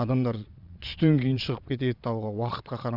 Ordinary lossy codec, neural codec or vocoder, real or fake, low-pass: none; vocoder, 22.05 kHz, 80 mel bands, Vocos; fake; 5.4 kHz